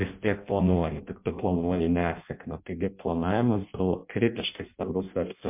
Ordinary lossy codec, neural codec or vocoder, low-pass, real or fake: MP3, 32 kbps; codec, 16 kHz in and 24 kHz out, 0.6 kbps, FireRedTTS-2 codec; 3.6 kHz; fake